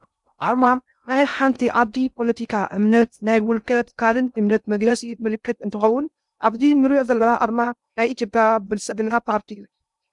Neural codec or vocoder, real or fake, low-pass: codec, 16 kHz in and 24 kHz out, 0.6 kbps, FocalCodec, streaming, 2048 codes; fake; 10.8 kHz